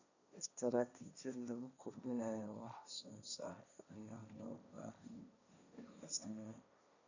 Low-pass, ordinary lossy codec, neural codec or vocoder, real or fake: 7.2 kHz; none; codec, 16 kHz, 1.1 kbps, Voila-Tokenizer; fake